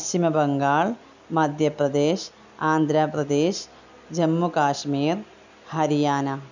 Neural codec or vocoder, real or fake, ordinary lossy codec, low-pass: none; real; none; 7.2 kHz